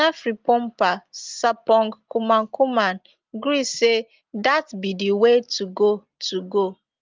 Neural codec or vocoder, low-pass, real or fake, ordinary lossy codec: none; 7.2 kHz; real; Opus, 32 kbps